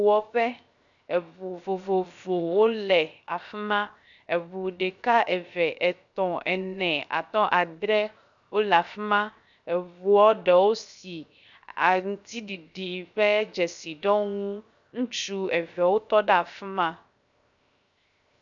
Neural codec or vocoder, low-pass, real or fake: codec, 16 kHz, 0.7 kbps, FocalCodec; 7.2 kHz; fake